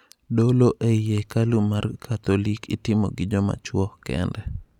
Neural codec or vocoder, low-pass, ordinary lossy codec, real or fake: none; 19.8 kHz; none; real